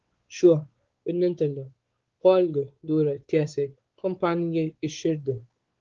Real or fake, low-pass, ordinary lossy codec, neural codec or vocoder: fake; 7.2 kHz; Opus, 16 kbps; codec, 16 kHz, 4 kbps, X-Codec, WavLM features, trained on Multilingual LibriSpeech